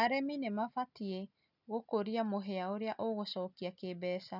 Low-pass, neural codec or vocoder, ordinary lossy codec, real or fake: 5.4 kHz; none; none; real